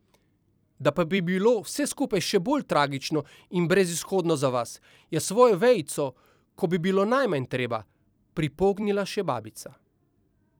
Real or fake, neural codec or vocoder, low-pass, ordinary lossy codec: fake; vocoder, 44.1 kHz, 128 mel bands every 256 samples, BigVGAN v2; none; none